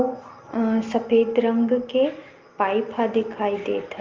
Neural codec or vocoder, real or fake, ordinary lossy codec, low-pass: none; real; Opus, 32 kbps; 7.2 kHz